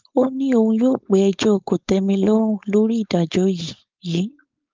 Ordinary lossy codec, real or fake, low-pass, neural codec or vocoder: Opus, 24 kbps; fake; 7.2 kHz; codec, 16 kHz, 4.8 kbps, FACodec